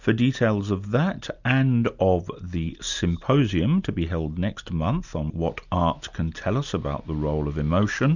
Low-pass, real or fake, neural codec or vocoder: 7.2 kHz; real; none